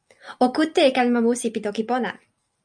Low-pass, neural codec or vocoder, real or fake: 9.9 kHz; none; real